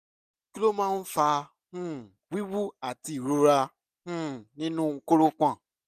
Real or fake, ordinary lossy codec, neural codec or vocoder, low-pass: real; none; none; 14.4 kHz